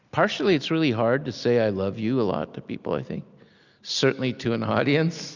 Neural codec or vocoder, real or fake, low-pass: none; real; 7.2 kHz